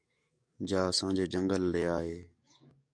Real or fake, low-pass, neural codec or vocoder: fake; 9.9 kHz; codec, 44.1 kHz, 7.8 kbps, DAC